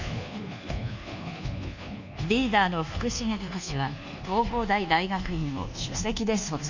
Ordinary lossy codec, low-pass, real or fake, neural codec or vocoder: none; 7.2 kHz; fake; codec, 24 kHz, 1.2 kbps, DualCodec